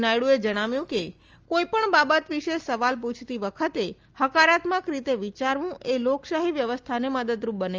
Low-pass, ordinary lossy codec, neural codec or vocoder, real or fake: 7.2 kHz; Opus, 24 kbps; none; real